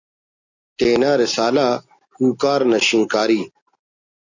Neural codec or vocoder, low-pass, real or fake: none; 7.2 kHz; real